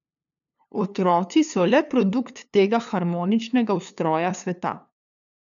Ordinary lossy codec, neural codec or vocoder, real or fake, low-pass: none; codec, 16 kHz, 2 kbps, FunCodec, trained on LibriTTS, 25 frames a second; fake; 7.2 kHz